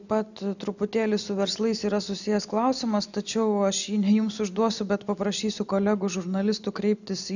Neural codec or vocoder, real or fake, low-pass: none; real; 7.2 kHz